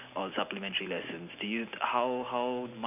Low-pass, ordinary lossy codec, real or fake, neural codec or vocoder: 3.6 kHz; none; real; none